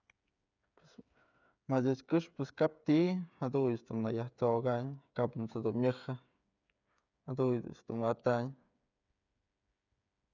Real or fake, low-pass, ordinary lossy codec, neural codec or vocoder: fake; 7.2 kHz; none; codec, 16 kHz, 8 kbps, FreqCodec, smaller model